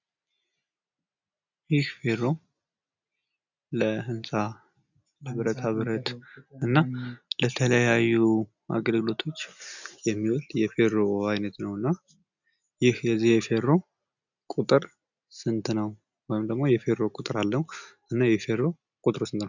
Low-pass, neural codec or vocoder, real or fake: 7.2 kHz; none; real